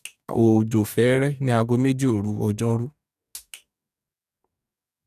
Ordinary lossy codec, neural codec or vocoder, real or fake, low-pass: none; codec, 44.1 kHz, 2.6 kbps, DAC; fake; 14.4 kHz